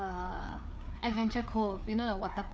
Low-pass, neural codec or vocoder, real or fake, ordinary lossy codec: none; codec, 16 kHz, 4 kbps, FreqCodec, larger model; fake; none